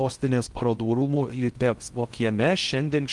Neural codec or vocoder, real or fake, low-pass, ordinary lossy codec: codec, 16 kHz in and 24 kHz out, 0.6 kbps, FocalCodec, streaming, 2048 codes; fake; 10.8 kHz; Opus, 24 kbps